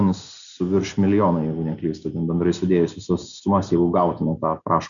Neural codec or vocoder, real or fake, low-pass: none; real; 7.2 kHz